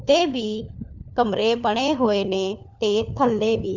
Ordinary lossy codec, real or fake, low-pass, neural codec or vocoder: none; fake; 7.2 kHz; codec, 16 kHz, 4 kbps, FunCodec, trained on LibriTTS, 50 frames a second